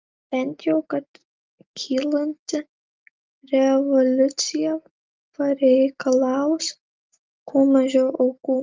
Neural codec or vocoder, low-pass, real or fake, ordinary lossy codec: none; 7.2 kHz; real; Opus, 24 kbps